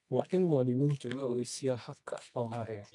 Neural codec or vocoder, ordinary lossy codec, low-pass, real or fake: codec, 24 kHz, 0.9 kbps, WavTokenizer, medium music audio release; none; 10.8 kHz; fake